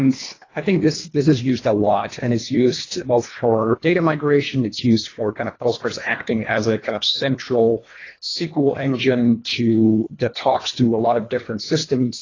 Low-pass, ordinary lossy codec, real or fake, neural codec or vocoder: 7.2 kHz; AAC, 32 kbps; fake; codec, 24 kHz, 1.5 kbps, HILCodec